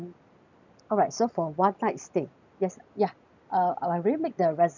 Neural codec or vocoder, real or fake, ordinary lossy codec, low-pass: none; real; none; 7.2 kHz